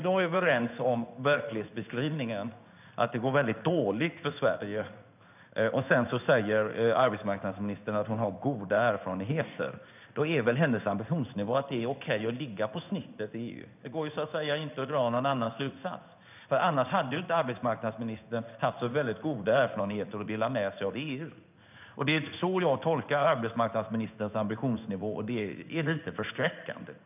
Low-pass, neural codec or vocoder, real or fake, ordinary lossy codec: 3.6 kHz; codec, 16 kHz in and 24 kHz out, 1 kbps, XY-Tokenizer; fake; none